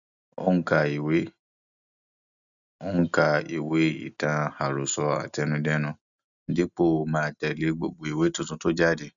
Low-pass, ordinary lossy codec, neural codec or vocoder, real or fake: 7.2 kHz; none; none; real